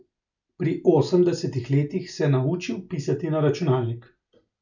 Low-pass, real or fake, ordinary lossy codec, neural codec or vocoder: 7.2 kHz; real; none; none